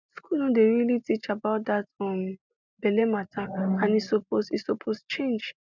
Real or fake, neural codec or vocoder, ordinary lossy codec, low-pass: real; none; none; 7.2 kHz